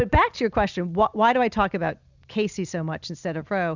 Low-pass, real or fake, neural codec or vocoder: 7.2 kHz; real; none